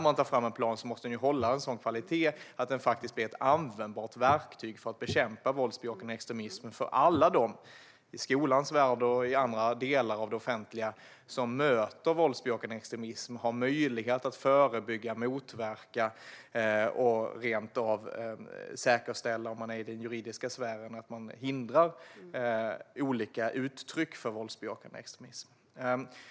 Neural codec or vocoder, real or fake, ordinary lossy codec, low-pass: none; real; none; none